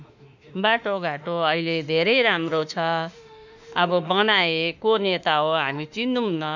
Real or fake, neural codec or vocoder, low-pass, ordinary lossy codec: fake; autoencoder, 48 kHz, 32 numbers a frame, DAC-VAE, trained on Japanese speech; 7.2 kHz; none